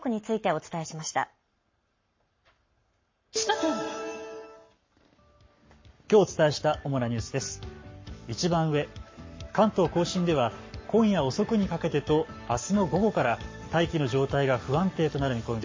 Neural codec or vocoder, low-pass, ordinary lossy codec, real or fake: codec, 44.1 kHz, 7.8 kbps, Pupu-Codec; 7.2 kHz; MP3, 32 kbps; fake